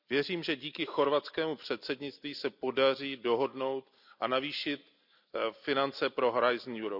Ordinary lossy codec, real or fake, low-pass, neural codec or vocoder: none; real; 5.4 kHz; none